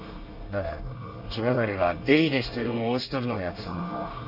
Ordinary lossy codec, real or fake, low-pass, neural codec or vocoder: none; fake; 5.4 kHz; codec, 24 kHz, 1 kbps, SNAC